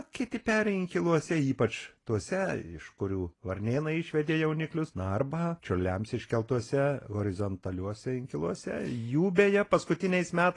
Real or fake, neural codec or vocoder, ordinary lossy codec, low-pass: real; none; AAC, 32 kbps; 10.8 kHz